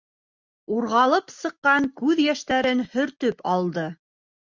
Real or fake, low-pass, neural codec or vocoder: real; 7.2 kHz; none